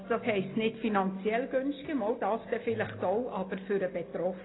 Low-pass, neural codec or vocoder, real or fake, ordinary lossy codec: 7.2 kHz; none; real; AAC, 16 kbps